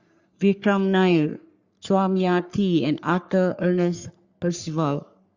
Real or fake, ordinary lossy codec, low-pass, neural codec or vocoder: fake; Opus, 64 kbps; 7.2 kHz; codec, 44.1 kHz, 3.4 kbps, Pupu-Codec